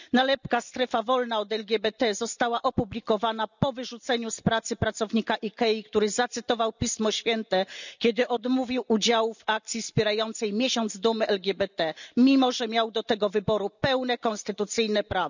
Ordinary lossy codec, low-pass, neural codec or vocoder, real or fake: none; 7.2 kHz; none; real